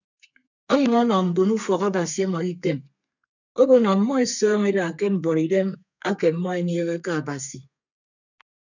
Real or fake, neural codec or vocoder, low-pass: fake; codec, 32 kHz, 1.9 kbps, SNAC; 7.2 kHz